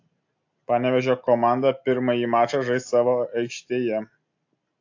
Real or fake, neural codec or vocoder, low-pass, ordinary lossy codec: real; none; 7.2 kHz; AAC, 48 kbps